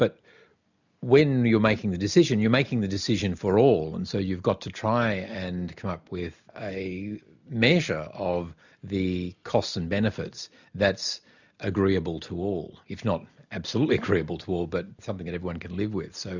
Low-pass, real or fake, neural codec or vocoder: 7.2 kHz; real; none